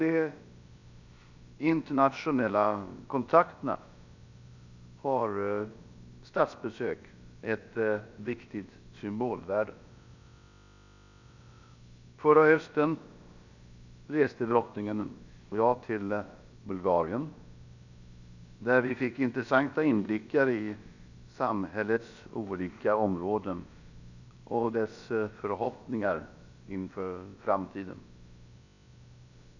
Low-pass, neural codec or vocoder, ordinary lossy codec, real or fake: 7.2 kHz; codec, 16 kHz, about 1 kbps, DyCAST, with the encoder's durations; none; fake